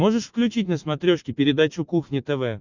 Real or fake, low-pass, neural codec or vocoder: real; 7.2 kHz; none